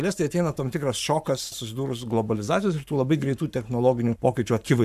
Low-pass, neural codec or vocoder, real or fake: 14.4 kHz; codec, 44.1 kHz, 7.8 kbps, Pupu-Codec; fake